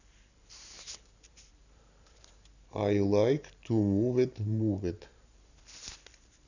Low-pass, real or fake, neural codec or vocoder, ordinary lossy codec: 7.2 kHz; fake; vocoder, 44.1 kHz, 128 mel bands every 512 samples, BigVGAN v2; none